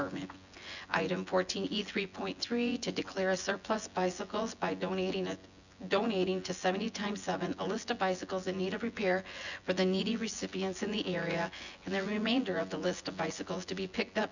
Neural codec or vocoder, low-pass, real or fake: vocoder, 24 kHz, 100 mel bands, Vocos; 7.2 kHz; fake